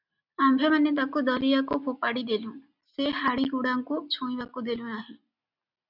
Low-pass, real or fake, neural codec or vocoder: 5.4 kHz; real; none